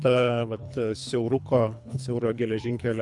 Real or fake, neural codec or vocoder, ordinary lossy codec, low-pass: fake; codec, 24 kHz, 3 kbps, HILCodec; MP3, 96 kbps; 10.8 kHz